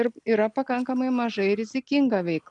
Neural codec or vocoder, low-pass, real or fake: none; 10.8 kHz; real